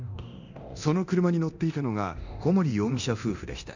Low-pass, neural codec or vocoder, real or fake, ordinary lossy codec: 7.2 kHz; codec, 24 kHz, 0.9 kbps, DualCodec; fake; none